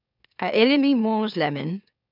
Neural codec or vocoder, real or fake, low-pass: autoencoder, 44.1 kHz, a latent of 192 numbers a frame, MeloTTS; fake; 5.4 kHz